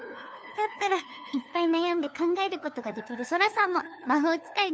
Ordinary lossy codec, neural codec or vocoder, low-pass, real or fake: none; codec, 16 kHz, 2 kbps, FunCodec, trained on LibriTTS, 25 frames a second; none; fake